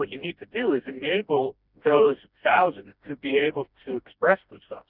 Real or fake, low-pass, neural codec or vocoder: fake; 5.4 kHz; codec, 16 kHz, 1 kbps, FreqCodec, smaller model